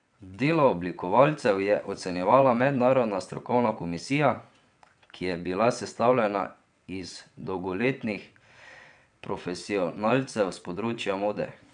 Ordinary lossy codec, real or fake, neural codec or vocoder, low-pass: none; fake; vocoder, 22.05 kHz, 80 mel bands, WaveNeXt; 9.9 kHz